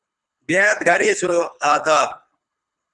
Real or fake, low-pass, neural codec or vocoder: fake; 10.8 kHz; codec, 24 kHz, 3 kbps, HILCodec